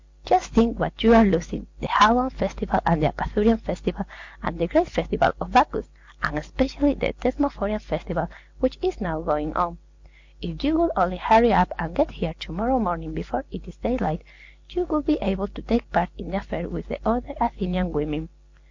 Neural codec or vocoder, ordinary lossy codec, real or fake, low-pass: none; MP3, 48 kbps; real; 7.2 kHz